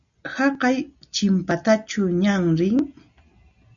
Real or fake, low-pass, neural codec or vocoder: real; 7.2 kHz; none